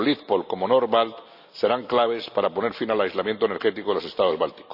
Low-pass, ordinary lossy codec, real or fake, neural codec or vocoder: 5.4 kHz; none; real; none